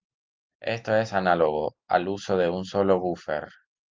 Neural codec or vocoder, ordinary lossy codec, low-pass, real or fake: none; Opus, 32 kbps; 7.2 kHz; real